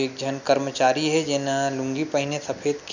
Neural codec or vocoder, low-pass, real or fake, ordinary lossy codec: none; 7.2 kHz; real; none